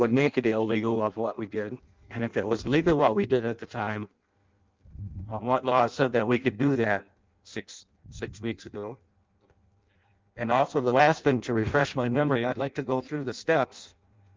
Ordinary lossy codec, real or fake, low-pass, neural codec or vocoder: Opus, 32 kbps; fake; 7.2 kHz; codec, 16 kHz in and 24 kHz out, 0.6 kbps, FireRedTTS-2 codec